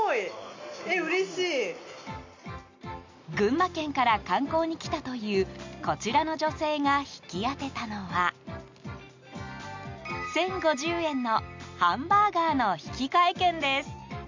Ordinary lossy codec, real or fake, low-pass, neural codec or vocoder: MP3, 64 kbps; real; 7.2 kHz; none